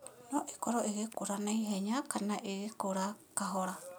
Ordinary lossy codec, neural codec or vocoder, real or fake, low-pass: none; none; real; none